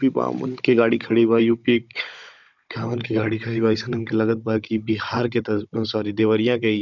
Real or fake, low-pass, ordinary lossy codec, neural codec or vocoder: fake; 7.2 kHz; none; codec, 16 kHz, 16 kbps, FunCodec, trained on Chinese and English, 50 frames a second